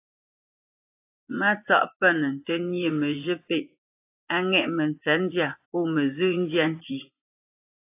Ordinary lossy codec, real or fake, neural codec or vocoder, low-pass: AAC, 24 kbps; fake; vocoder, 24 kHz, 100 mel bands, Vocos; 3.6 kHz